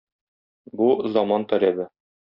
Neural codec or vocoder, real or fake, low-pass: none; real; 5.4 kHz